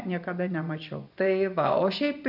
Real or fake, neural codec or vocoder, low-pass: fake; vocoder, 24 kHz, 100 mel bands, Vocos; 5.4 kHz